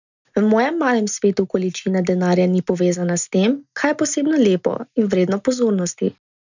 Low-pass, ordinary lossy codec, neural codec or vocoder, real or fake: 7.2 kHz; none; none; real